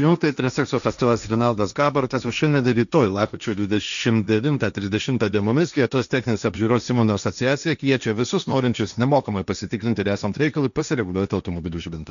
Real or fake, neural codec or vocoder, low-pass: fake; codec, 16 kHz, 1.1 kbps, Voila-Tokenizer; 7.2 kHz